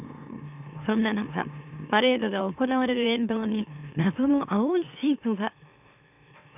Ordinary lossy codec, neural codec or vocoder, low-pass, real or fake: none; autoencoder, 44.1 kHz, a latent of 192 numbers a frame, MeloTTS; 3.6 kHz; fake